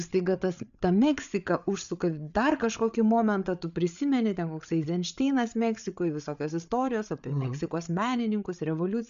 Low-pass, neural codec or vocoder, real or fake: 7.2 kHz; codec, 16 kHz, 8 kbps, FreqCodec, larger model; fake